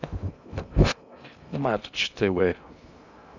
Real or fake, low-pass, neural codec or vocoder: fake; 7.2 kHz; codec, 16 kHz in and 24 kHz out, 0.6 kbps, FocalCodec, streaming, 2048 codes